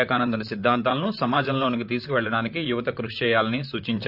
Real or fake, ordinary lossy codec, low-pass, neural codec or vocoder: fake; none; 5.4 kHz; vocoder, 44.1 kHz, 128 mel bands, Pupu-Vocoder